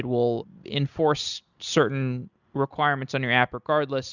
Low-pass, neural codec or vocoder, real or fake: 7.2 kHz; none; real